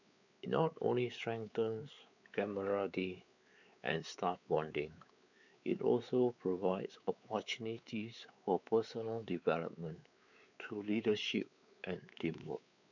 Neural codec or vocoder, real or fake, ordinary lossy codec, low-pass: codec, 16 kHz, 4 kbps, X-Codec, WavLM features, trained on Multilingual LibriSpeech; fake; none; 7.2 kHz